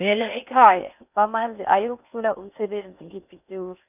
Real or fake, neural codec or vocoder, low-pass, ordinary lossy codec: fake; codec, 16 kHz in and 24 kHz out, 0.6 kbps, FocalCodec, streaming, 4096 codes; 3.6 kHz; none